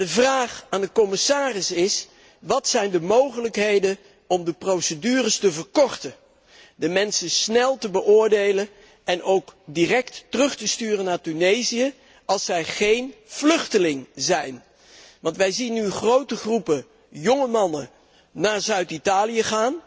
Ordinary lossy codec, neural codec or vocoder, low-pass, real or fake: none; none; none; real